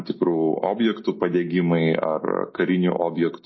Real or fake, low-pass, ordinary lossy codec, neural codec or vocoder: real; 7.2 kHz; MP3, 24 kbps; none